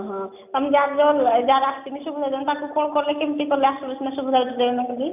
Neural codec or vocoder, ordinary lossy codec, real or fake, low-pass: none; none; real; 3.6 kHz